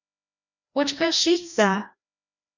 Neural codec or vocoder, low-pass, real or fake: codec, 16 kHz, 1 kbps, FreqCodec, larger model; 7.2 kHz; fake